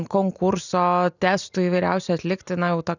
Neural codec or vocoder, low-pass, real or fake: none; 7.2 kHz; real